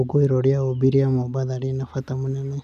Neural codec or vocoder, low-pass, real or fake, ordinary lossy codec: none; 14.4 kHz; real; none